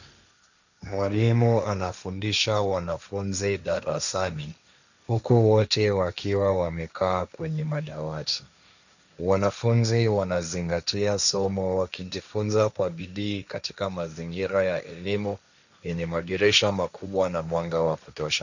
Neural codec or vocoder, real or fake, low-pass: codec, 16 kHz, 1.1 kbps, Voila-Tokenizer; fake; 7.2 kHz